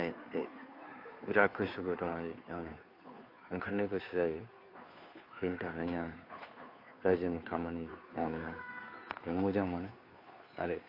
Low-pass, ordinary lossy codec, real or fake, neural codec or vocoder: 5.4 kHz; AAC, 32 kbps; fake; codec, 16 kHz, 2 kbps, FunCodec, trained on Chinese and English, 25 frames a second